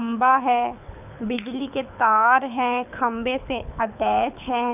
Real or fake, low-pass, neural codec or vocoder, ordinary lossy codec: fake; 3.6 kHz; codec, 24 kHz, 6 kbps, HILCodec; none